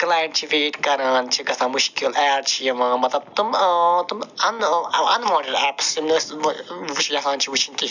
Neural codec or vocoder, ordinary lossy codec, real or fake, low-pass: none; none; real; 7.2 kHz